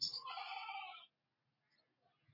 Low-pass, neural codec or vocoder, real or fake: 5.4 kHz; none; real